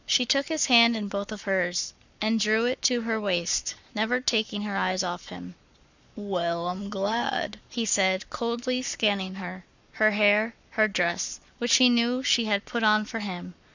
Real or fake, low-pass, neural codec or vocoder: fake; 7.2 kHz; codec, 44.1 kHz, 7.8 kbps, Pupu-Codec